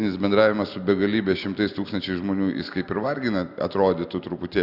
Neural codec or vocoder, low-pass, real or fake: none; 5.4 kHz; real